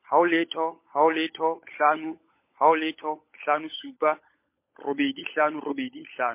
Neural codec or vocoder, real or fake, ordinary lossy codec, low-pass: codec, 16 kHz, 16 kbps, FunCodec, trained on LibriTTS, 50 frames a second; fake; MP3, 24 kbps; 3.6 kHz